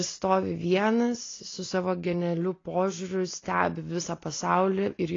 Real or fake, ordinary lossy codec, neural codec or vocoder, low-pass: real; AAC, 32 kbps; none; 7.2 kHz